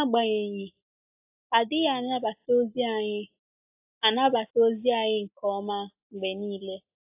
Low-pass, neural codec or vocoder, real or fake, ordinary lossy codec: 3.6 kHz; none; real; AAC, 32 kbps